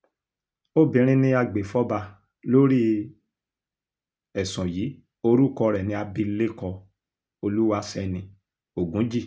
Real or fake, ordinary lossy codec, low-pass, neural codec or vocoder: real; none; none; none